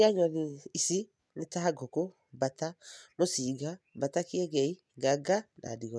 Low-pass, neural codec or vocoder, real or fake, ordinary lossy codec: none; none; real; none